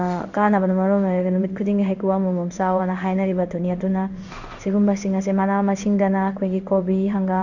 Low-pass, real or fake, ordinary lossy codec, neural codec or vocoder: 7.2 kHz; fake; none; codec, 16 kHz in and 24 kHz out, 1 kbps, XY-Tokenizer